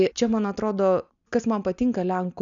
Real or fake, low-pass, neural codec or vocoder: real; 7.2 kHz; none